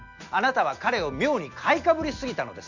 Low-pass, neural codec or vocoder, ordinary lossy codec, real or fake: 7.2 kHz; none; none; real